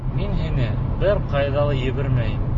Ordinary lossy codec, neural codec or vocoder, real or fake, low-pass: MP3, 32 kbps; none; real; 7.2 kHz